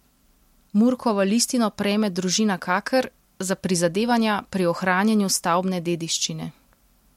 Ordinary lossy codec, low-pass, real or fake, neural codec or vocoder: MP3, 64 kbps; 19.8 kHz; real; none